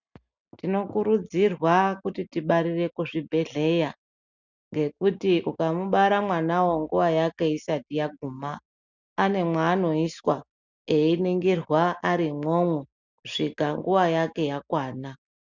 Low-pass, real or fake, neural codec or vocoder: 7.2 kHz; real; none